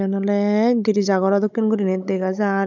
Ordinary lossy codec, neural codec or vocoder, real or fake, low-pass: none; codec, 16 kHz, 16 kbps, FunCodec, trained on Chinese and English, 50 frames a second; fake; 7.2 kHz